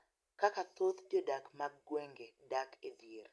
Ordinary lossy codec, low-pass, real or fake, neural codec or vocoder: none; none; real; none